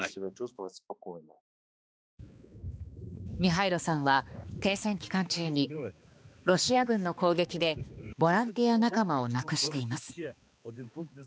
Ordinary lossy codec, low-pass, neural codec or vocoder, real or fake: none; none; codec, 16 kHz, 2 kbps, X-Codec, HuBERT features, trained on balanced general audio; fake